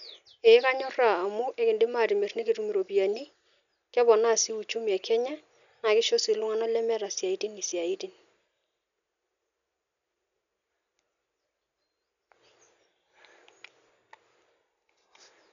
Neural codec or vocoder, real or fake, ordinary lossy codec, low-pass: none; real; none; 7.2 kHz